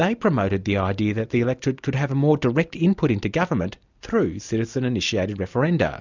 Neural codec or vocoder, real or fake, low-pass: none; real; 7.2 kHz